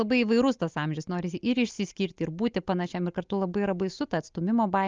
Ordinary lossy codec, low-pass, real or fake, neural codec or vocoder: Opus, 24 kbps; 7.2 kHz; real; none